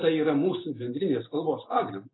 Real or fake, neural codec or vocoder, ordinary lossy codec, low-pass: real; none; AAC, 16 kbps; 7.2 kHz